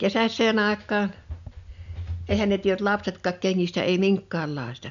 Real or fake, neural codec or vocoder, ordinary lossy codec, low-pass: real; none; none; 7.2 kHz